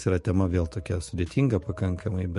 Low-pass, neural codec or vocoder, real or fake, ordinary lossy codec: 14.4 kHz; autoencoder, 48 kHz, 128 numbers a frame, DAC-VAE, trained on Japanese speech; fake; MP3, 48 kbps